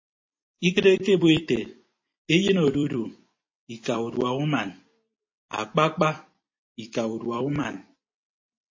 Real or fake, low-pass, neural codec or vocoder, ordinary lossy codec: fake; 7.2 kHz; vocoder, 44.1 kHz, 128 mel bands every 256 samples, BigVGAN v2; MP3, 32 kbps